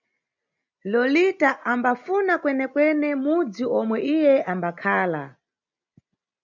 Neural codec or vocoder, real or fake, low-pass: none; real; 7.2 kHz